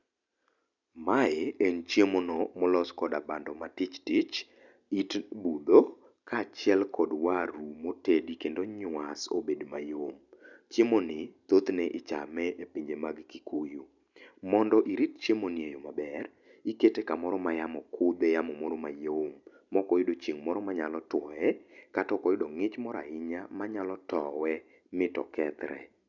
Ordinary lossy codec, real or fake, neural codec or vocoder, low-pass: none; real; none; 7.2 kHz